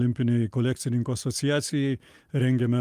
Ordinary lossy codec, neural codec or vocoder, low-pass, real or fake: Opus, 32 kbps; none; 14.4 kHz; real